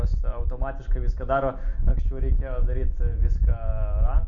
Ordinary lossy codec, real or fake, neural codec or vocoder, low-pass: MP3, 64 kbps; real; none; 7.2 kHz